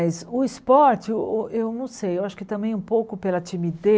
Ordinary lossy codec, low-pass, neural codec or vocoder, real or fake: none; none; none; real